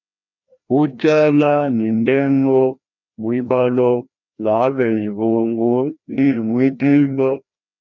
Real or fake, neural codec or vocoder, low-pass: fake; codec, 16 kHz, 1 kbps, FreqCodec, larger model; 7.2 kHz